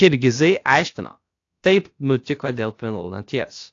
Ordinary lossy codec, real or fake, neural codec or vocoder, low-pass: AAC, 48 kbps; fake; codec, 16 kHz, about 1 kbps, DyCAST, with the encoder's durations; 7.2 kHz